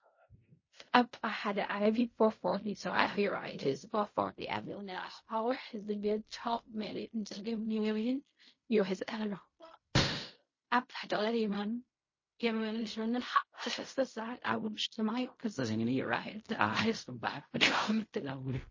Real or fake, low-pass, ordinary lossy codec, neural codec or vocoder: fake; 7.2 kHz; MP3, 32 kbps; codec, 16 kHz in and 24 kHz out, 0.4 kbps, LongCat-Audio-Codec, fine tuned four codebook decoder